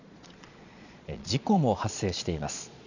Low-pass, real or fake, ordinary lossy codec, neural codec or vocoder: 7.2 kHz; fake; none; vocoder, 22.05 kHz, 80 mel bands, Vocos